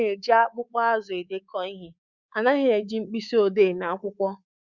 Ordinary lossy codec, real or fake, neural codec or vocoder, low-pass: Opus, 64 kbps; fake; codec, 24 kHz, 3.1 kbps, DualCodec; 7.2 kHz